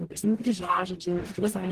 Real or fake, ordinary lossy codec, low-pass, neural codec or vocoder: fake; Opus, 16 kbps; 14.4 kHz; codec, 44.1 kHz, 0.9 kbps, DAC